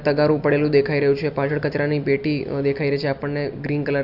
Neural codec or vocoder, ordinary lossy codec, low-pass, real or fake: none; none; 5.4 kHz; real